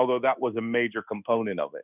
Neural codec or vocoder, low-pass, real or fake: codec, 16 kHz in and 24 kHz out, 1 kbps, XY-Tokenizer; 3.6 kHz; fake